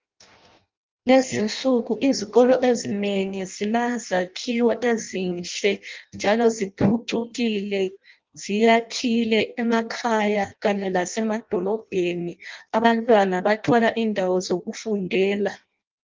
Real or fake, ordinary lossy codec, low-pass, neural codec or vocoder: fake; Opus, 32 kbps; 7.2 kHz; codec, 16 kHz in and 24 kHz out, 0.6 kbps, FireRedTTS-2 codec